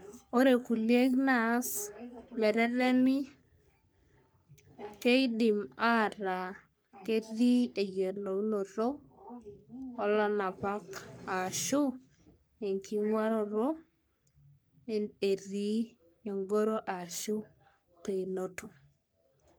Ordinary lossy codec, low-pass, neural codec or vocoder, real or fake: none; none; codec, 44.1 kHz, 3.4 kbps, Pupu-Codec; fake